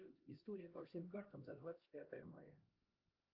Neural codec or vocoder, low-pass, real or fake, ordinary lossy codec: codec, 16 kHz, 1 kbps, X-Codec, HuBERT features, trained on LibriSpeech; 5.4 kHz; fake; Opus, 32 kbps